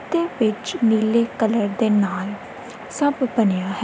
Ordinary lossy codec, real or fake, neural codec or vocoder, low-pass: none; real; none; none